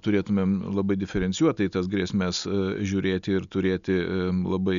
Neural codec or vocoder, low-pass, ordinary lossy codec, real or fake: none; 7.2 kHz; MP3, 96 kbps; real